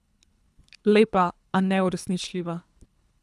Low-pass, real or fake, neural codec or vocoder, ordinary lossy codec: none; fake; codec, 24 kHz, 3 kbps, HILCodec; none